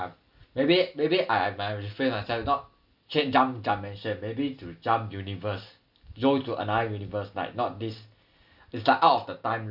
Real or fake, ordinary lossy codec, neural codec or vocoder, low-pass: real; none; none; 5.4 kHz